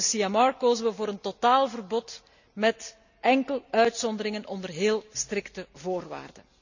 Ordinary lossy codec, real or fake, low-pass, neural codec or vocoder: none; real; 7.2 kHz; none